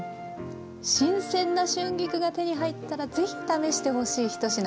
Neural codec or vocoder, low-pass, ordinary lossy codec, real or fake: none; none; none; real